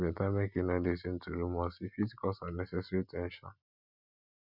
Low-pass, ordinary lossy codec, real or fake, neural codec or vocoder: 5.4 kHz; none; real; none